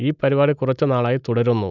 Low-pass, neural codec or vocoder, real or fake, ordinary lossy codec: 7.2 kHz; none; real; none